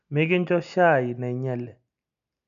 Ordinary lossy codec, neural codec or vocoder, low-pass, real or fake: none; none; 7.2 kHz; real